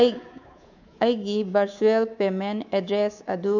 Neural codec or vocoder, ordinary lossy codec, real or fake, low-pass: codec, 24 kHz, 3.1 kbps, DualCodec; none; fake; 7.2 kHz